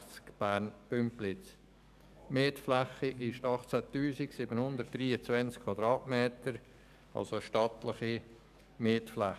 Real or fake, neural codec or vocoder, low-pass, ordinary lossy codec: fake; codec, 44.1 kHz, 7.8 kbps, DAC; 14.4 kHz; none